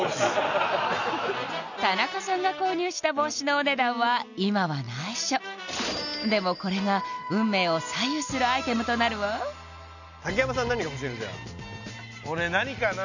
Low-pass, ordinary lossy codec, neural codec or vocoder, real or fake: 7.2 kHz; none; none; real